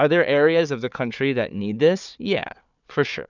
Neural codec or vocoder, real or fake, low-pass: codec, 16 kHz, 4 kbps, FunCodec, trained on Chinese and English, 50 frames a second; fake; 7.2 kHz